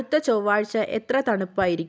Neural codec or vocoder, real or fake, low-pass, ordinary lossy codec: none; real; none; none